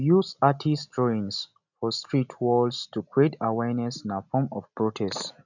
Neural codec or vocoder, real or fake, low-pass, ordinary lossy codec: none; real; 7.2 kHz; none